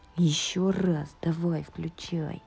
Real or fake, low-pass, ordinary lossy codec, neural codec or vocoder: real; none; none; none